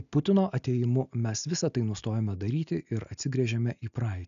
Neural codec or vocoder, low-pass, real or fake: none; 7.2 kHz; real